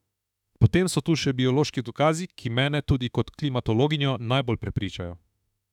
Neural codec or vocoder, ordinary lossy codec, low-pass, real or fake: autoencoder, 48 kHz, 32 numbers a frame, DAC-VAE, trained on Japanese speech; none; 19.8 kHz; fake